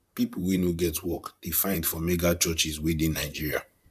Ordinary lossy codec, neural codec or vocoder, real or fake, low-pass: none; vocoder, 44.1 kHz, 128 mel bands, Pupu-Vocoder; fake; 14.4 kHz